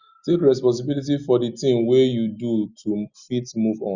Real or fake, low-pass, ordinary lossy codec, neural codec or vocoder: real; 7.2 kHz; Opus, 64 kbps; none